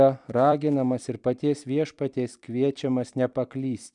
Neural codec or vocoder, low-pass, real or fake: vocoder, 44.1 kHz, 128 mel bands every 256 samples, BigVGAN v2; 10.8 kHz; fake